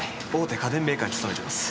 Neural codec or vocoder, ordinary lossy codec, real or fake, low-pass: none; none; real; none